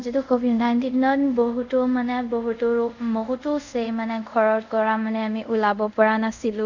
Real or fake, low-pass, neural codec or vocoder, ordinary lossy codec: fake; 7.2 kHz; codec, 24 kHz, 0.5 kbps, DualCodec; none